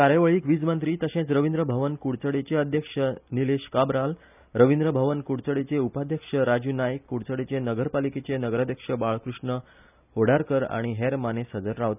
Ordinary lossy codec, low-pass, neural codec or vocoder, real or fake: none; 3.6 kHz; none; real